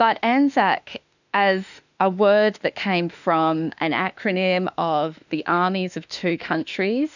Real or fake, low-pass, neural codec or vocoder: fake; 7.2 kHz; autoencoder, 48 kHz, 32 numbers a frame, DAC-VAE, trained on Japanese speech